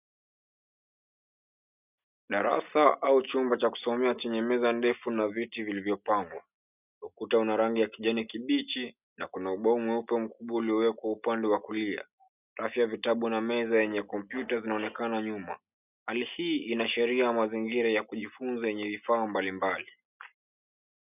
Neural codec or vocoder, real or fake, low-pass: none; real; 3.6 kHz